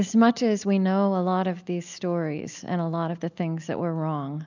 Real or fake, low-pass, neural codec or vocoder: real; 7.2 kHz; none